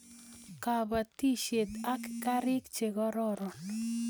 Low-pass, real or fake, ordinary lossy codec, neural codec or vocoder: none; real; none; none